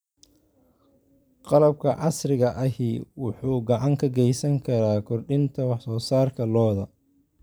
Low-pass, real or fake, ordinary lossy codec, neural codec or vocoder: none; fake; none; vocoder, 44.1 kHz, 128 mel bands every 256 samples, BigVGAN v2